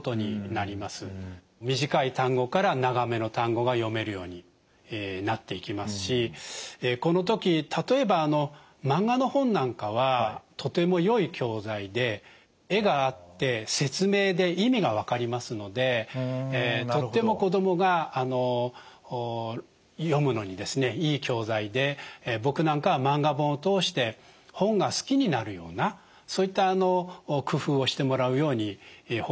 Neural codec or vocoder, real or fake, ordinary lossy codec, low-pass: none; real; none; none